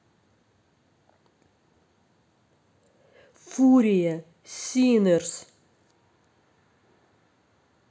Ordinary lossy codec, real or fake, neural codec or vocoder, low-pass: none; real; none; none